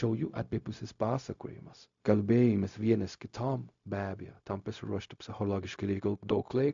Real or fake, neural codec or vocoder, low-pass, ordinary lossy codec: fake; codec, 16 kHz, 0.4 kbps, LongCat-Audio-Codec; 7.2 kHz; MP3, 64 kbps